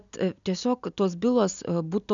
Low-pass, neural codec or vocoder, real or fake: 7.2 kHz; none; real